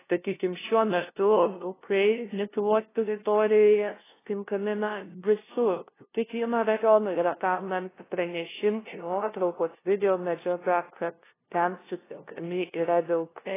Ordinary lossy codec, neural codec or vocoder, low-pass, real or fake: AAC, 16 kbps; codec, 16 kHz, 0.5 kbps, FunCodec, trained on LibriTTS, 25 frames a second; 3.6 kHz; fake